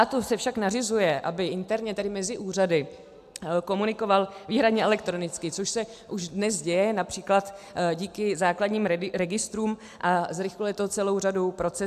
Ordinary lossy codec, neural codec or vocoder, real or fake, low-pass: AAC, 96 kbps; none; real; 14.4 kHz